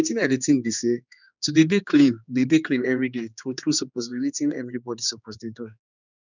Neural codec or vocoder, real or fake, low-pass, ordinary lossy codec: codec, 16 kHz, 2 kbps, X-Codec, HuBERT features, trained on general audio; fake; 7.2 kHz; none